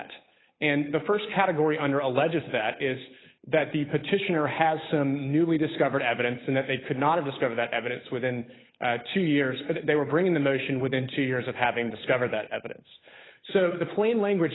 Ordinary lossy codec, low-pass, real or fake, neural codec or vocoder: AAC, 16 kbps; 7.2 kHz; fake; codec, 16 kHz, 8 kbps, FunCodec, trained on Chinese and English, 25 frames a second